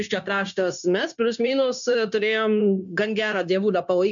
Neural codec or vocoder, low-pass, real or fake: codec, 16 kHz, 0.9 kbps, LongCat-Audio-Codec; 7.2 kHz; fake